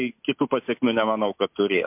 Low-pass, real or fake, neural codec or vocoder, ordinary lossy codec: 3.6 kHz; real; none; MP3, 32 kbps